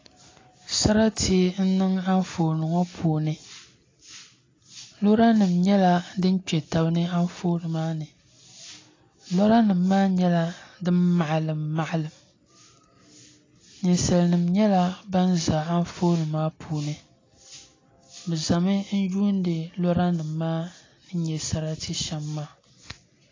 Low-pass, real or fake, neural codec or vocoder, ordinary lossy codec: 7.2 kHz; real; none; AAC, 32 kbps